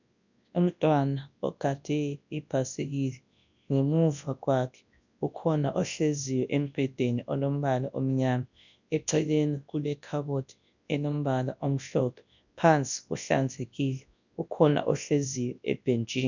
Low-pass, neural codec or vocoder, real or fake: 7.2 kHz; codec, 24 kHz, 0.9 kbps, WavTokenizer, large speech release; fake